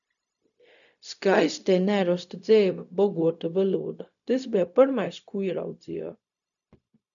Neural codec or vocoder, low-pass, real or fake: codec, 16 kHz, 0.4 kbps, LongCat-Audio-Codec; 7.2 kHz; fake